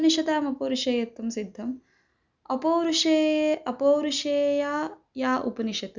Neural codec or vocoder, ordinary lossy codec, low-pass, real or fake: none; none; 7.2 kHz; real